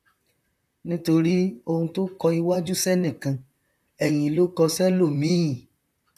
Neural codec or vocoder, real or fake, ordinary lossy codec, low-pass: vocoder, 44.1 kHz, 128 mel bands, Pupu-Vocoder; fake; none; 14.4 kHz